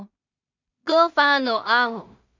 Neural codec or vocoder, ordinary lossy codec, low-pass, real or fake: codec, 16 kHz in and 24 kHz out, 0.4 kbps, LongCat-Audio-Codec, two codebook decoder; MP3, 64 kbps; 7.2 kHz; fake